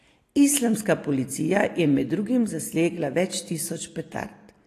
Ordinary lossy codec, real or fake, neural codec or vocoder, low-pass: AAC, 48 kbps; real; none; 14.4 kHz